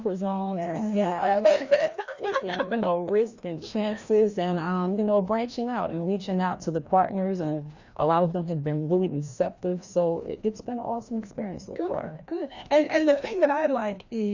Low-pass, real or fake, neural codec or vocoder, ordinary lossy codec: 7.2 kHz; fake; codec, 16 kHz, 1 kbps, FreqCodec, larger model; Opus, 64 kbps